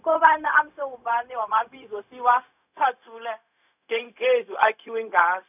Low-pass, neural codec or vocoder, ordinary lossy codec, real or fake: 3.6 kHz; codec, 16 kHz, 0.4 kbps, LongCat-Audio-Codec; none; fake